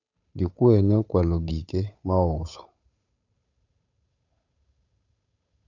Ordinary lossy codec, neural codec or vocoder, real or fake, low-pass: none; codec, 16 kHz, 8 kbps, FunCodec, trained on Chinese and English, 25 frames a second; fake; 7.2 kHz